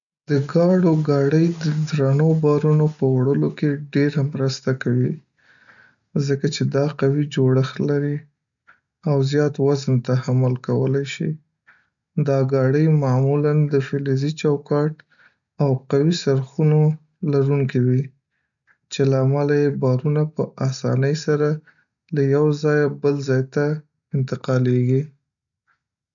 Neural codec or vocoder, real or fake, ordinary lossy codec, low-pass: none; real; none; 7.2 kHz